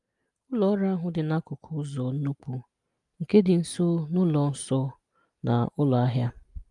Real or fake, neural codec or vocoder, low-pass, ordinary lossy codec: real; none; 10.8 kHz; Opus, 32 kbps